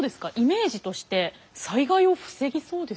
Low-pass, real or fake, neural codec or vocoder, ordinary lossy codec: none; real; none; none